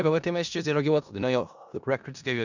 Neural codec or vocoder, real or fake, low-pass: codec, 16 kHz in and 24 kHz out, 0.4 kbps, LongCat-Audio-Codec, four codebook decoder; fake; 7.2 kHz